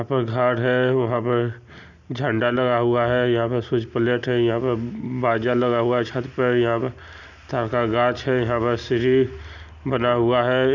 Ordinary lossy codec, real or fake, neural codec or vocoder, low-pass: none; real; none; 7.2 kHz